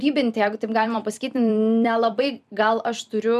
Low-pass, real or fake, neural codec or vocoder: 14.4 kHz; fake; vocoder, 44.1 kHz, 128 mel bands every 256 samples, BigVGAN v2